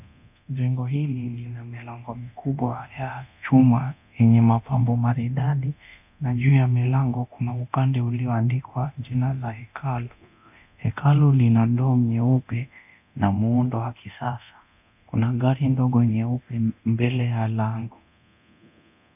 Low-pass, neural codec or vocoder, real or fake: 3.6 kHz; codec, 24 kHz, 0.9 kbps, DualCodec; fake